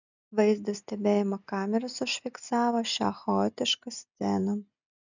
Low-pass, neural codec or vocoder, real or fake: 7.2 kHz; none; real